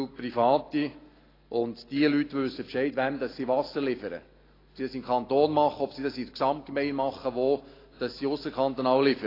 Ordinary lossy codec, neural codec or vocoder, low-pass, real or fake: AAC, 24 kbps; none; 5.4 kHz; real